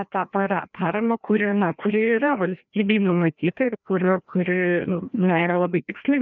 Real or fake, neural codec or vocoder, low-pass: fake; codec, 16 kHz, 1 kbps, FreqCodec, larger model; 7.2 kHz